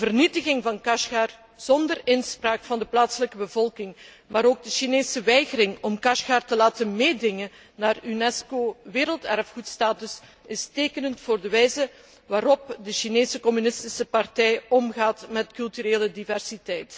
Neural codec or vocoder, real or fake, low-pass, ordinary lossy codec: none; real; none; none